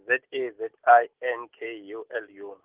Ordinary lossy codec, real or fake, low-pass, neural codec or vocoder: Opus, 16 kbps; real; 3.6 kHz; none